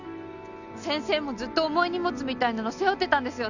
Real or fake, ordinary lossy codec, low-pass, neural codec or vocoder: real; none; 7.2 kHz; none